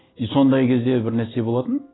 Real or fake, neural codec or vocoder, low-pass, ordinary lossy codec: real; none; 7.2 kHz; AAC, 16 kbps